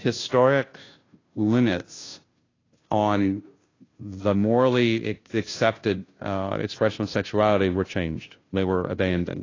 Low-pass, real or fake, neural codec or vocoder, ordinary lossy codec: 7.2 kHz; fake; codec, 16 kHz, 0.5 kbps, FunCodec, trained on Chinese and English, 25 frames a second; AAC, 32 kbps